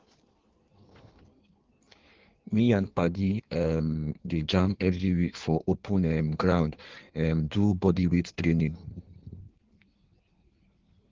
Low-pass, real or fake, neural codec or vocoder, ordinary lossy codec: 7.2 kHz; fake; codec, 16 kHz in and 24 kHz out, 1.1 kbps, FireRedTTS-2 codec; Opus, 16 kbps